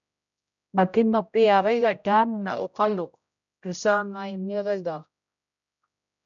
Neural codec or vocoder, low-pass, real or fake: codec, 16 kHz, 0.5 kbps, X-Codec, HuBERT features, trained on general audio; 7.2 kHz; fake